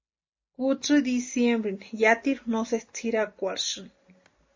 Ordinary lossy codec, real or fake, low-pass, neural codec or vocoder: MP3, 32 kbps; real; 7.2 kHz; none